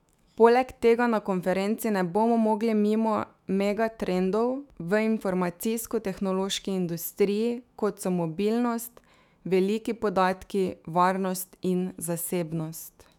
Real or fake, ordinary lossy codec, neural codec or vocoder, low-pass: fake; none; autoencoder, 48 kHz, 128 numbers a frame, DAC-VAE, trained on Japanese speech; 19.8 kHz